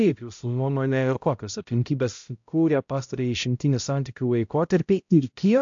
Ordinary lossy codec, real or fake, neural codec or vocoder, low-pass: AAC, 64 kbps; fake; codec, 16 kHz, 0.5 kbps, X-Codec, HuBERT features, trained on balanced general audio; 7.2 kHz